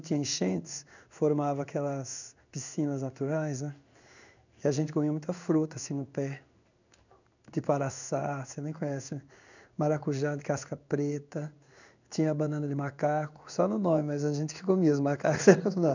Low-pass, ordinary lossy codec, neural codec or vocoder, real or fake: 7.2 kHz; none; codec, 16 kHz in and 24 kHz out, 1 kbps, XY-Tokenizer; fake